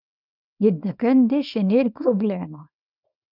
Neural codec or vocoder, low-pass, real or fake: codec, 24 kHz, 0.9 kbps, WavTokenizer, small release; 5.4 kHz; fake